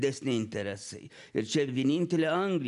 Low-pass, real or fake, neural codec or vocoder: 10.8 kHz; real; none